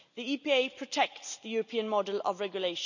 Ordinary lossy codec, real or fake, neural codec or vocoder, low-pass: none; real; none; 7.2 kHz